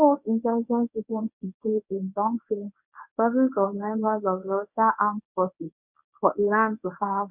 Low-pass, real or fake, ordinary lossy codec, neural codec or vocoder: 3.6 kHz; fake; none; codec, 24 kHz, 0.9 kbps, WavTokenizer, medium speech release version 1